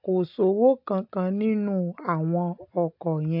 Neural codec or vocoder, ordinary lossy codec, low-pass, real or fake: none; AAC, 48 kbps; 5.4 kHz; real